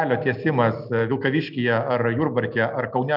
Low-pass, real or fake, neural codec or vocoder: 5.4 kHz; real; none